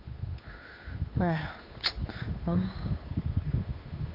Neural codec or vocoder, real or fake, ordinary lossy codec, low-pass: codec, 16 kHz, 2 kbps, FunCodec, trained on Chinese and English, 25 frames a second; fake; none; 5.4 kHz